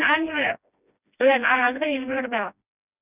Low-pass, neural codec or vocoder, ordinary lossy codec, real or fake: 3.6 kHz; codec, 16 kHz, 1 kbps, FreqCodec, smaller model; none; fake